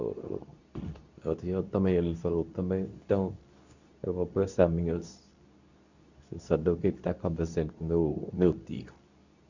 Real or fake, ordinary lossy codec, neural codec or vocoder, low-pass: fake; none; codec, 24 kHz, 0.9 kbps, WavTokenizer, medium speech release version 2; 7.2 kHz